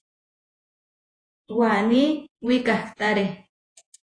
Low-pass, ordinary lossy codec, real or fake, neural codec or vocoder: 9.9 kHz; AAC, 48 kbps; fake; vocoder, 48 kHz, 128 mel bands, Vocos